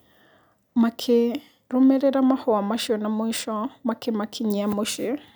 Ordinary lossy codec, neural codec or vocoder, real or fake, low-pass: none; none; real; none